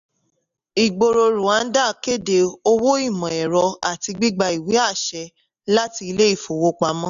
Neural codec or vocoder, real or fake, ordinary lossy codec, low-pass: none; real; none; 7.2 kHz